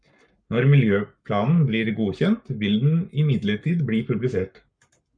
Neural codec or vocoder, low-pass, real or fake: codec, 44.1 kHz, 7.8 kbps, Pupu-Codec; 9.9 kHz; fake